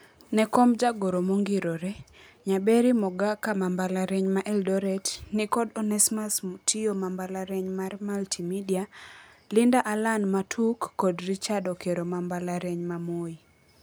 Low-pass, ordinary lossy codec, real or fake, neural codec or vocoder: none; none; real; none